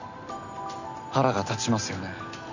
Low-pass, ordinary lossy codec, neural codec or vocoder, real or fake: 7.2 kHz; none; none; real